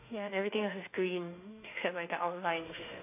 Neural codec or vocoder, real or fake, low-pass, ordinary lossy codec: autoencoder, 48 kHz, 32 numbers a frame, DAC-VAE, trained on Japanese speech; fake; 3.6 kHz; AAC, 24 kbps